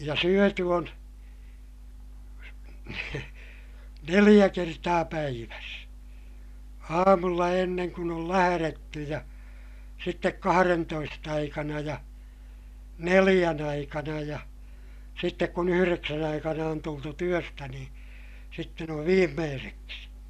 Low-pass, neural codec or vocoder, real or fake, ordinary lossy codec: 14.4 kHz; none; real; none